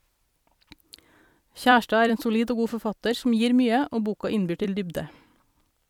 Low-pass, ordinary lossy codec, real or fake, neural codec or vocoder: 19.8 kHz; MP3, 96 kbps; fake; vocoder, 44.1 kHz, 128 mel bands every 256 samples, BigVGAN v2